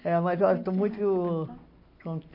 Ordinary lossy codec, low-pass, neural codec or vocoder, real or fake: MP3, 32 kbps; 5.4 kHz; none; real